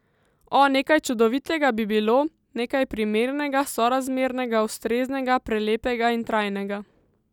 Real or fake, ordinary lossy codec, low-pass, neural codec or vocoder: real; none; 19.8 kHz; none